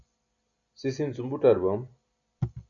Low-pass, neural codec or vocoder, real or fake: 7.2 kHz; none; real